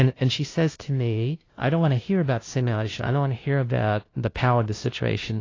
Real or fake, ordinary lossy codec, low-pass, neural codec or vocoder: fake; AAC, 32 kbps; 7.2 kHz; codec, 16 kHz, 0.5 kbps, FunCodec, trained on LibriTTS, 25 frames a second